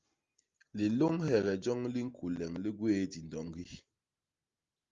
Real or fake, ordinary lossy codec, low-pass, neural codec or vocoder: real; Opus, 32 kbps; 7.2 kHz; none